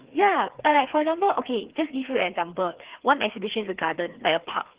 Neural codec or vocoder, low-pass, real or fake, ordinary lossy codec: codec, 16 kHz, 2 kbps, FreqCodec, larger model; 3.6 kHz; fake; Opus, 16 kbps